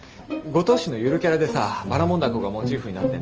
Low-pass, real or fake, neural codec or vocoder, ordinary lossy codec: 7.2 kHz; real; none; Opus, 24 kbps